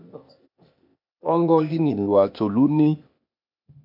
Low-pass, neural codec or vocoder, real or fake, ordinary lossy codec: 5.4 kHz; codec, 16 kHz, 0.8 kbps, ZipCodec; fake; MP3, 48 kbps